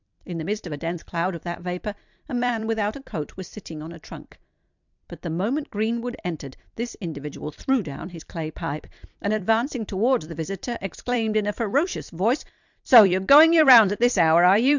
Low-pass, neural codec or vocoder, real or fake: 7.2 kHz; vocoder, 44.1 kHz, 128 mel bands every 256 samples, BigVGAN v2; fake